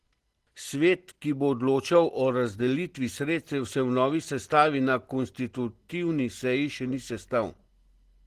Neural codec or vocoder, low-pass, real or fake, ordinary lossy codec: none; 14.4 kHz; real; Opus, 16 kbps